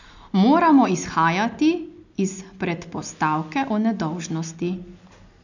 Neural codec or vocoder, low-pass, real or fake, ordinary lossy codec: none; 7.2 kHz; real; none